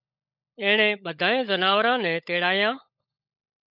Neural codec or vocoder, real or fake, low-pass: codec, 16 kHz, 16 kbps, FunCodec, trained on LibriTTS, 50 frames a second; fake; 5.4 kHz